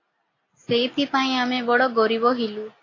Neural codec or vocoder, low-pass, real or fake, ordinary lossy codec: none; 7.2 kHz; real; AAC, 32 kbps